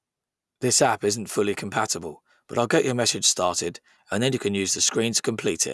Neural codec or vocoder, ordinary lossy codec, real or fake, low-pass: none; none; real; none